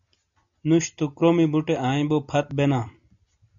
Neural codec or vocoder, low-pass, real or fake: none; 7.2 kHz; real